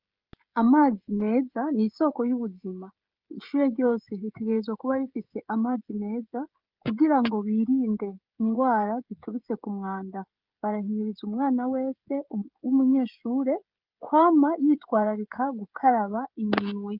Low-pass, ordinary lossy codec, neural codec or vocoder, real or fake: 5.4 kHz; Opus, 24 kbps; codec, 16 kHz, 16 kbps, FreqCodec, smaller model; fake